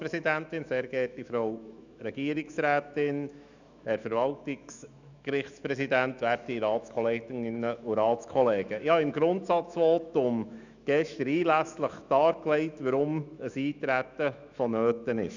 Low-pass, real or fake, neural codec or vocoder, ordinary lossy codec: 7.2 kHz; fake; autoencoder, 48 kHz, 128 numbers a frame, DAC-VAE, trained on Japanese speech; none